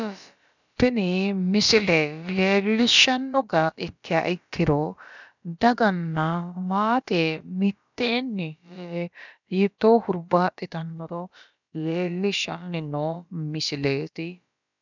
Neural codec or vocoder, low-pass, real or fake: codec, 16 kHz, about 1 kbps, DyCAST, with the encoder's durations; 7.2 kHz; fake